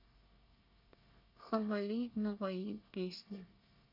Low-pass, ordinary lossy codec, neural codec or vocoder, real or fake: 5.4 kHz; none; codec, 24 kHz, 1 kbps, SNAC; fake